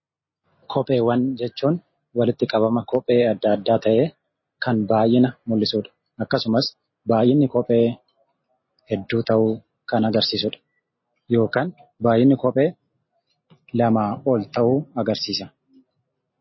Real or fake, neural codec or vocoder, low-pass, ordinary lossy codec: real; none; 7.2 kHz; MP3, 24 kbps